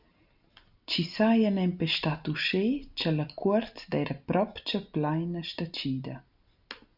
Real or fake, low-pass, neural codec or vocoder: real; 5.4 kHz; none